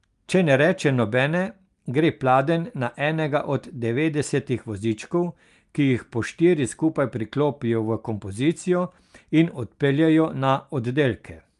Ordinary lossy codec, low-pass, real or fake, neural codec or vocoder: Opus, 32 kbps; 10.8 kHz; real; none